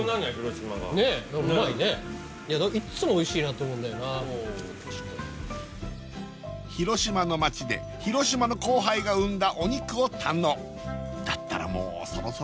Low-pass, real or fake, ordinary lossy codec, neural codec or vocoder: none; real; none; none